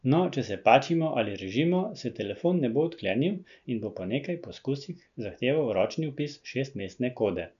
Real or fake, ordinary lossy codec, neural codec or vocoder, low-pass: real; none; none; 7.2 kHz